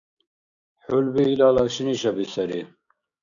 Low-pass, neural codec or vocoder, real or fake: 7.2 kHz; codec, 16 kHz, 6 kbps, DAC; fake